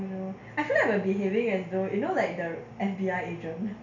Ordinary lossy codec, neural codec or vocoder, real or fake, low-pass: none; none; real; 7.2 kHz